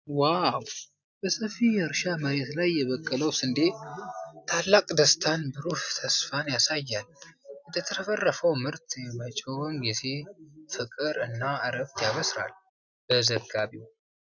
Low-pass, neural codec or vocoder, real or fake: 7.2 kHz; none; real